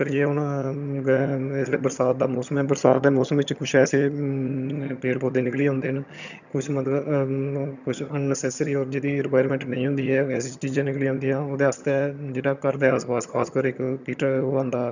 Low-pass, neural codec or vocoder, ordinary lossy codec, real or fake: 7.2 kHz; vocoder, 22.05 kHz, 80 mel bands, HiFi-GAN; none; fake